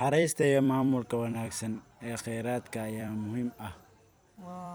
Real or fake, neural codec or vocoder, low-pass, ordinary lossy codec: fake; vocoder, 44.1 kHz, 128 mel bands every 256 samples, BigVGAN v2; none; none